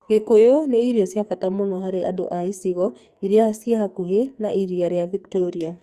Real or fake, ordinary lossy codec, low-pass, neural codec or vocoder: fake; Opus, 64 kbps; 14.4 kHz; codec, 44.1 kHz, 2.6 kbps, SNAC